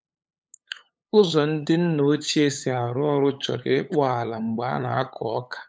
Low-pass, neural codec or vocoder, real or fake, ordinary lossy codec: none; codec, 16 kHz, 8 kbps, FunCodec, trained on LibriTTS, 25 frames a second; fake; none